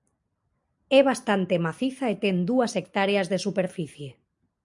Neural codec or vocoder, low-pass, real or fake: none; 10.8 kHz; real